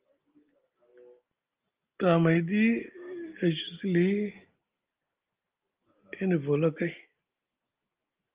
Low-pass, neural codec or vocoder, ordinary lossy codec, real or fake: 3.6 kHz; none; Opus, 32 kbps; real